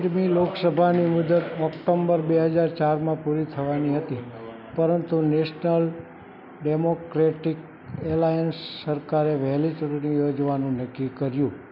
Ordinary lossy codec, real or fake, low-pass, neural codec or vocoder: none; real; 5.4 kHz; none